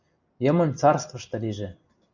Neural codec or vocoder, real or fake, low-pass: none; real; 7.2 kHz